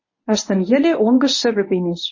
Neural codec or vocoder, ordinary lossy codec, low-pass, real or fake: codec, 24 kHz, 0.9 kbps, WavTokenizer, medium speech release version 1; MP3, 32 kbps; 7.2 kHz; fake